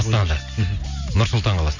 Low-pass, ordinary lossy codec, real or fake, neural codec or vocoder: 7.2 kHz; none; real; none